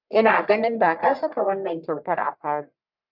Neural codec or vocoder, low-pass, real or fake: codec, 44.1 kHz, 1.7 kbps, Pupu-Codec; 5.4 kHz; fake